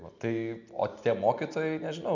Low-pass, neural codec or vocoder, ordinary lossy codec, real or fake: 7.2 kHz; none; MP3, 64 kbps; real